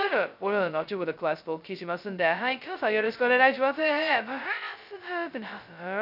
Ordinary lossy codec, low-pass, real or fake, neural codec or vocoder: none; 5.4 kHz; fake; codec, 16 kHz, 0.2 kbps, FocalCodec